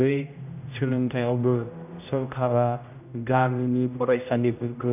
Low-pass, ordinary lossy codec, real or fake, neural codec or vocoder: 3.6 kHz; none; fake; codec, 16 kHz, 0.5 kbps, X-Codec, HuBERT features, trained on general audio